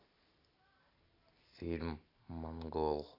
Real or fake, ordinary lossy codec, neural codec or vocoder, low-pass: real; AAC, 48 kbps; none; 5.4 kHz